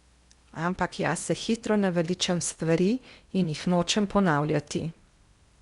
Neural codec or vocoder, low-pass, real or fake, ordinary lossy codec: codec, 16 kHz in and 24 kHz out, 0.8 kbps, FocalCodec, streaming, 65536 codes; 10.8 kHz; fake; Opus, 64 kbps